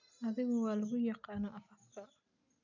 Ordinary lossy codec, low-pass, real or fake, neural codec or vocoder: none; 7.2 kHz; real; none